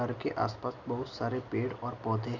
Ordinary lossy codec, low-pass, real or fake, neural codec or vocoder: none; 7.2 kHz; real; none